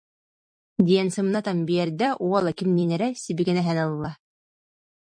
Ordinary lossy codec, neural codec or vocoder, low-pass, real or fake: MP3, 64 kbps; vocoder, 44.1 kHz, 128 mel bands every 256 samples, BigVGAN v2; 9.9 kHz; fake